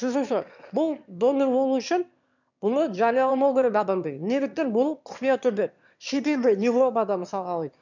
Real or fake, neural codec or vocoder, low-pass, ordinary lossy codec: fake; autoencoder, 22.05 kHz, a latent of 192 numbers a frame, VITS, trained on one speaker; 7.2 kHz; none